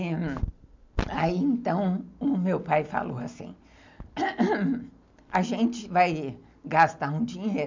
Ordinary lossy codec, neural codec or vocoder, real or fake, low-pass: MP3, 64 kbps; none; real; 7.2 kHz